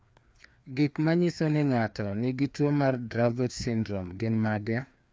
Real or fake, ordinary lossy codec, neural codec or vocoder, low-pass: fake; none; codec, 16 kHz, 2 kbps, FreqCodec, larger model; none